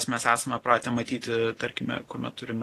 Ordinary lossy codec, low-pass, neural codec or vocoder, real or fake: AAC, 48 kbps; 14.4 kHz; none; real